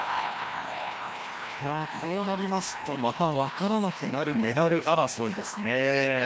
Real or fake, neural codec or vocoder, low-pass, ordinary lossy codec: fake; codec, 16 kHz, 1 kbps, FreqCodec, larger model; none; none